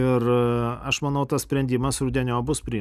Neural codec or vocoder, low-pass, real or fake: none; 14.4 kHz; real